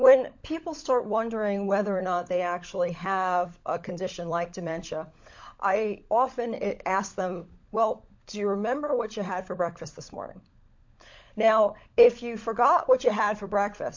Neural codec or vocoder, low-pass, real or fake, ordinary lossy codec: codec, 16 kHz, 16 kbps, FunCodec, trained on LibriTTS, 50 frames a second; 7.2 kHz; fake; MP3, 48 kbps